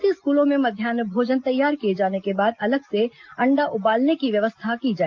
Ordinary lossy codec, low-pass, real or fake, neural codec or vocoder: Opus, 32 kbps; 7.2 kHz; real; none